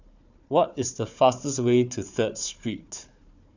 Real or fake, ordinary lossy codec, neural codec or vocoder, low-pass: fake; none; codec, 16 kHz, 4 kbps, FunCodec, trained on Chinese and English, 50 frames a second; 7.2 kHz